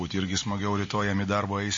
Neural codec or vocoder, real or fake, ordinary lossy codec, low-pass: none; real; MP3, 32 kbps; 7.2 kHz